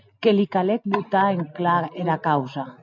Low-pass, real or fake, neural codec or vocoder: 7.2 kHz; real; none